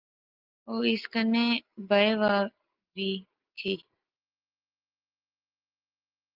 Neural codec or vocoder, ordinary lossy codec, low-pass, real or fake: none; Opus, 32 kbps; 5.4 kHz; real